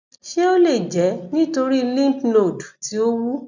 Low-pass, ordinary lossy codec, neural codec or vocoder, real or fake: 7.2 kHz; none; none; real